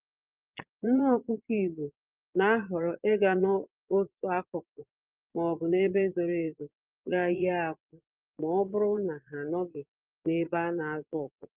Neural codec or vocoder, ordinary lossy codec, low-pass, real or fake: vocoder, 22.05 kHz, 80 mel bands, Vocos; Opus, 32 kbps; 3.6 kHz; fake